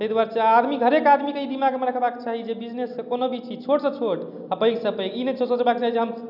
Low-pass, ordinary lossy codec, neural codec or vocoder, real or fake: 5.4 kHz; none; none; real